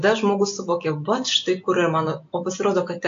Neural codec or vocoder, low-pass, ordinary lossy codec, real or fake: none; 7.2 kHz; AAC, 48 kbps; real